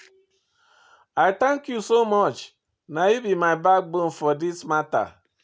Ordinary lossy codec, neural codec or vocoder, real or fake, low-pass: none; none; real; none